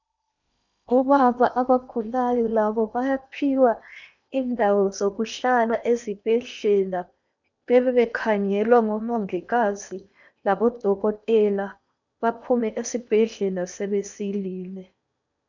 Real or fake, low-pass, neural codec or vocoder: fake; 7.2 kHz; codec, 16 kHz in and 24 kHz out, 0.8 kbps, FocalCodec, streaming, 65536 codes